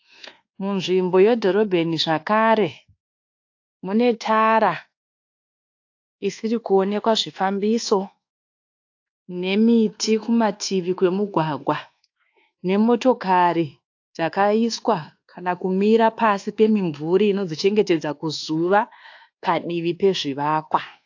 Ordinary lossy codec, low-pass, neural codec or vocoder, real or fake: AAC, 48 kbps; 7.2 kHz; codec, 24 kHz, 1.2 kbps, DualCodec; fake